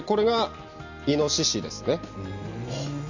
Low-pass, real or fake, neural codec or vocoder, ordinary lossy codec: 7.2 kHz; real; none; none